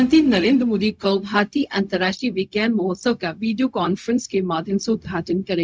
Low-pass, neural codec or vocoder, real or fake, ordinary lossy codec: none; codec, 16 kHz, 0.4 kbps, LongCat-Audio-Codec; fake; none